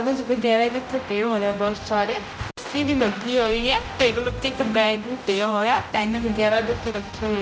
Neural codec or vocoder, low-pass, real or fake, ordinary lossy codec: codec, 16 kHz, 0.5 kbps, X-Codec, HuBERT features, trained on general audio; none; fake; none